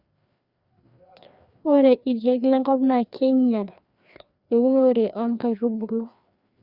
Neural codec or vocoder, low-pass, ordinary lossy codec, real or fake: codec, 16 kHz, 2 kbps, FreqCodec, larger model; 5.4 kHz; Opus, 64 kbps; fake